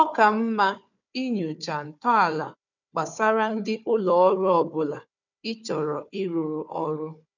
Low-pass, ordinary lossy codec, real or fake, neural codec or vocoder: 7.2 kHz; none; fake; codec, 16 kHz, 4 kbps, FunCodec, trained on Chinese and English, 50 frames a second